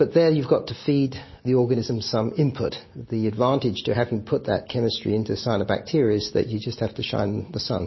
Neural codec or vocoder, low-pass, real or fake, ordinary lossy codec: none; 7.2 kHz; real; MP3, 24 kbps